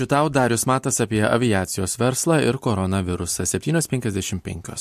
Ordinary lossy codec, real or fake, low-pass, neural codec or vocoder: MP3, 64 kbps; real; 14.4 kHz; none